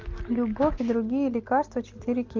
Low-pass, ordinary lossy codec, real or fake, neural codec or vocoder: 7.2 kHz; Opus, 24 kbps; real; none